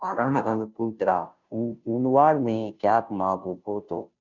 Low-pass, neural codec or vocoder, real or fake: 7.2 kHz; codec, 16 kHz, 0.5 kbps, FunCodec, trained on Chinese and English, 25 frames a second; fake